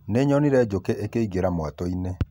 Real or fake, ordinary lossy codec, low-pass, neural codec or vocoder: real; none; 19.8 kHz; none